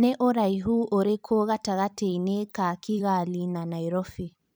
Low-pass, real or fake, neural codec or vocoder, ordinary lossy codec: none; fake; vocoder, 44.1 kHz, 128 mel bands every 256 samples, BigVGAN v2; none